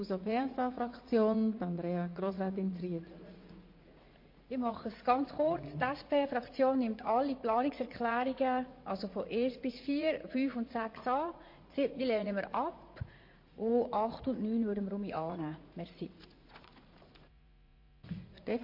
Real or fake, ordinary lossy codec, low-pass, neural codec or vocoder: fake; MP3, 32 kbps; 5.4 kHz; vocoder, 44.1 kHz, 80 mel bands, Vocos